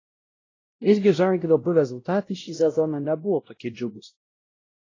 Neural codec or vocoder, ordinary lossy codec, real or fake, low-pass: codec, 16 kHz, 0.5 kbps, X-Codec, WavLM features, trained on Multilingual LibriSpeech; AAC, 32 kbps; fake; 7.2 kHz